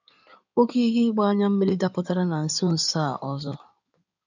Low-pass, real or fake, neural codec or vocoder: 7.2 kHz; fake; codec, 16 kHz in and 24 kHz out, 2.2 kbps, FireRedTTS-2 codec